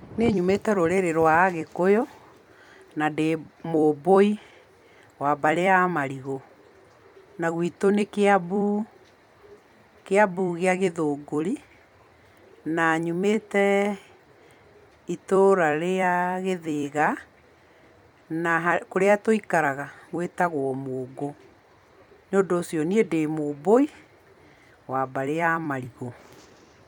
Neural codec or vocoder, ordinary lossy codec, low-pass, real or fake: vocoder, 44.1 kHz, 128 mel bands every 256 samples, BigVGAN v2; none; 19.8 kHz; fake